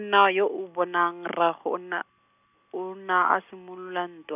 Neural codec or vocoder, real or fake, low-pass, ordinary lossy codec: none; real; 3.6 kHz; none